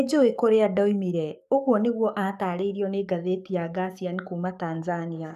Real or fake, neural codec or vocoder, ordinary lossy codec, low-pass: fake; codec, 44.1 kHz, 7.8 kbps, DAC; none; 14.4 kHz